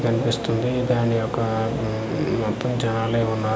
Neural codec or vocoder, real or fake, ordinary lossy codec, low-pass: none; real; none; none